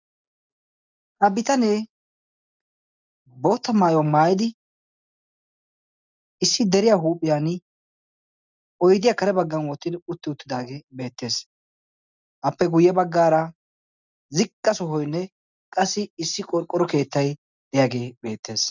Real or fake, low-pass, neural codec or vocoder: real; 7.2 kHz; none